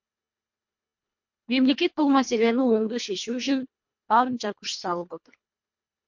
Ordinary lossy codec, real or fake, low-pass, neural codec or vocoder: MP3, 48 kbps; fake; 7.2 kHz; codec, 24 kHz, 1.5 kbps, HILCodec